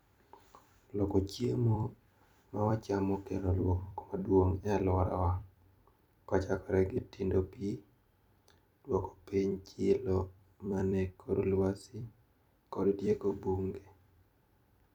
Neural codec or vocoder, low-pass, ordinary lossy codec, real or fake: vocoder, 44.1 kHz, 128 mel bands every 256 samples, BigVGAN v2; 19.8 kHz; none; fake